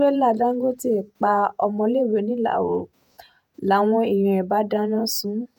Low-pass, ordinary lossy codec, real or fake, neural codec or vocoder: none; none; fake; vocoder, 48 kHz, 128 mel bands, Vocos